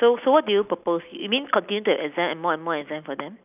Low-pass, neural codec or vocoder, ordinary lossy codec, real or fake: 3.6 kHz; none; none; real